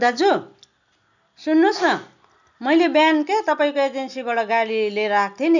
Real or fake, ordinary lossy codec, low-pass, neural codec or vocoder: real; none; 7.2 kHz; none